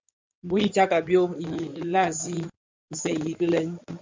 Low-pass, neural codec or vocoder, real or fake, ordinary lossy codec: 7.2 kHz; codec, 16 kHz in and 24 kHz out, 2.2 kbps, FireRedTTS-2 codec; fake; MP3, 64 kbps